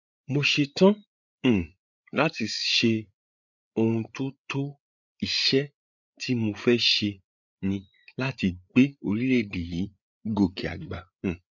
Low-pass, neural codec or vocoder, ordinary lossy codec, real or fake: 7.2 kHz; codec, 16 kHz, 8 kbps, FreqCodec, larger model; none; fake